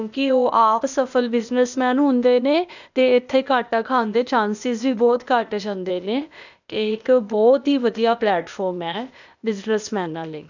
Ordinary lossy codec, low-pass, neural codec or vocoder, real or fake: none; 7.2 kHz; codec, 16 kHz, 0.8 kbps, ZipCodec; fake